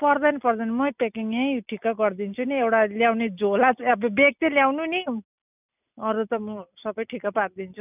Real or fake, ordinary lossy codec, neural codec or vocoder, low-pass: real; none; none; 3.6 kHz